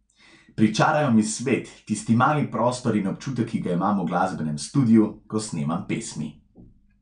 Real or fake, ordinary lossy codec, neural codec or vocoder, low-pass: real; none; none; 9.9 kHz